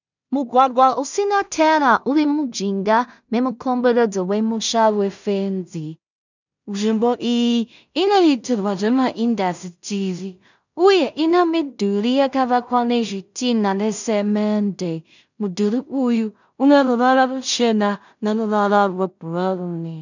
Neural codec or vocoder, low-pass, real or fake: codec, 16 kHz in and 24 kHz out, 0.4 kbps, LongCat-Audio-Codec, two codebook decoder; 7.2 kHz; fake